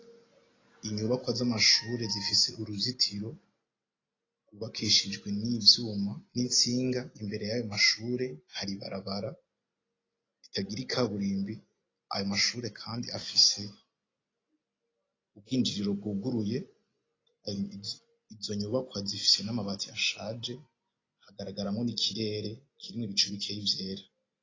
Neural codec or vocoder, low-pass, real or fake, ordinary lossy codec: none; 7.2 kHz; real; AAC, 32 kbps